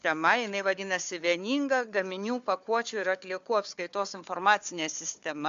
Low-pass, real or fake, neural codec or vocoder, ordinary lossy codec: 7.2 kHz; fake; codec, 16 kHz, 4 kbps, FunCodec, trained on Chinese and English, 50 frames a second; AAC, 64 kbps